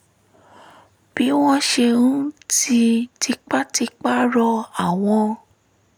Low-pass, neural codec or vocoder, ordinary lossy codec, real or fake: none; none; none; real